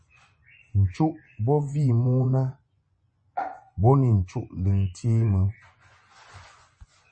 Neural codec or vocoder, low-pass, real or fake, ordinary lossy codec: vocoder, 24 kHz, 100 mel bands, Vocos; 9.9 kHz; fake; MP3, 32 kbps